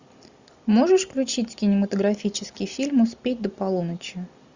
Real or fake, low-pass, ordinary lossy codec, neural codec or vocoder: real; 7.2 kHz; Opus, 64 kbps; none